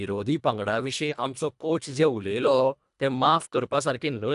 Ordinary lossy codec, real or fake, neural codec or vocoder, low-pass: none; fake; codec, 24 kHz, 1.5 kbps, HILCodec; 10.8 kHz